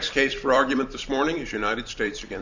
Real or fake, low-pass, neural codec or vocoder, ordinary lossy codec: fake; 7.2 kHz; vocoder, 44.1 kHz, 128 mel bands every 256 samples, BigVGAN v2; Opus, 64 kbps